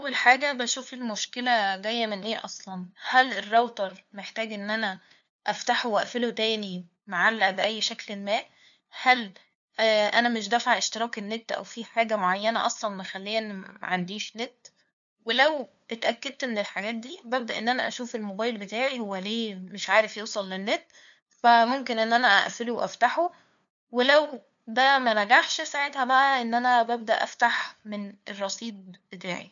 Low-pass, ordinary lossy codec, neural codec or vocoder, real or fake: 7.2 kHz; none; codec, 16 kHz, 2 kbps, FunCodec, trained on LibriTTS, 25 frames a second; fake